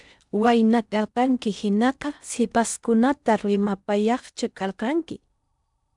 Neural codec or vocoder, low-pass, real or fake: codec, 16 kHz in and 24 kHz out, 0.6 kbps, FocalCodec, streaming, 4096 codes; 10.8 kHz; fake